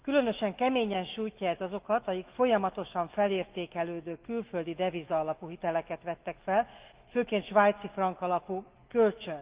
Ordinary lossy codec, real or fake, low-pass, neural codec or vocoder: Opus, 32 kbps; fake; 3.6 kHz; autoencoder, 48 kHz, 128 numbers a frame, DAC-VAE, trained on Japanese speech